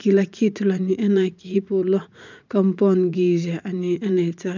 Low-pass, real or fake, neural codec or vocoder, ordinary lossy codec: 7.2 kHz; fake; vocoder, 44.1 kHz, 80 mel bands, Vocos; none